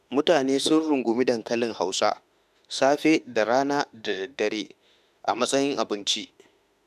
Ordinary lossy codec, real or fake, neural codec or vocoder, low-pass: none; fake; autoencoder, 48 kHz, 32 numbers a frame, DAC-VAE, trained on Japanese speech; 14.4 kHz